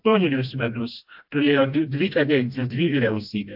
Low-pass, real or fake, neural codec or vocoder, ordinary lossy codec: 5.4 kHz; fake; codec, 16 kHz, 1 kbps, FreqCodec, smaller model; AAC, 48 kbps